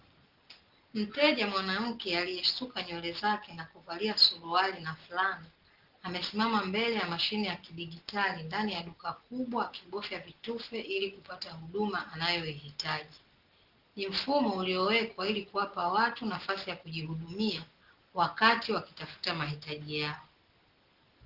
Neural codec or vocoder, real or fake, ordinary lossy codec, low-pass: none; real; Opus, 16 kbps; 5.4 kHz